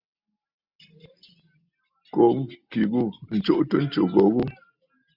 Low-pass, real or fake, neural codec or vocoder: 5.4 kHz; real; none